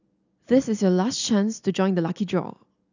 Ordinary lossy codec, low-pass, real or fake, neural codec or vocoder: none; 7.2 kHz; real; none